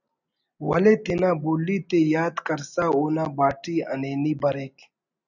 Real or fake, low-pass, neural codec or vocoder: real; 7.2 kHz; none